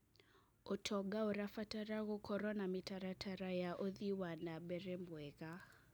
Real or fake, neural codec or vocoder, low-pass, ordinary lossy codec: real; none; none; none